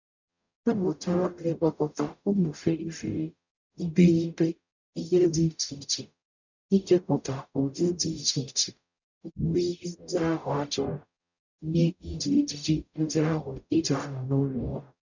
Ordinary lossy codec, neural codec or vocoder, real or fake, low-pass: none; codec, 44.1 kHz, 0.9 kbps, DAC; fake; 7.2 kHz